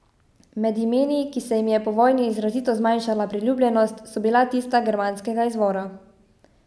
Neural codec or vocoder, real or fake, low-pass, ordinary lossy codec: none; real; none; none